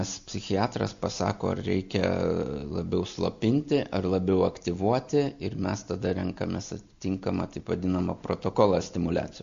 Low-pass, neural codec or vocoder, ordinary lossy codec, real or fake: 7.2 kHz; none; AAC, 64 kbps; real